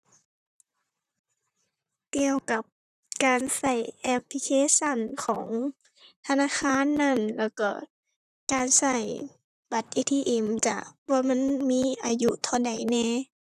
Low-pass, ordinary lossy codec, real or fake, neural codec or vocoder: 14.4 kHz; none; fake; vocoder, 44.1 kHz, 128 mel bands, Pupu-Vocoder